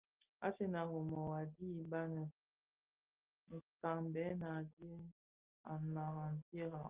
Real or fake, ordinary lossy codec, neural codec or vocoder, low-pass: real; Opus, 24 kbps; none; 3.6 kHz